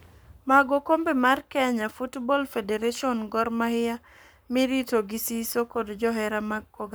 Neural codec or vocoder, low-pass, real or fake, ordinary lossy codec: codec, 44.1 kHz, 7.8 kbps, Pupu-Codec; none; fake; none